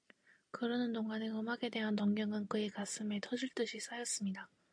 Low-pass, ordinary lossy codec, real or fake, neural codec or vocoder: 9.9 kHz; AAC, 64 kbps; real; none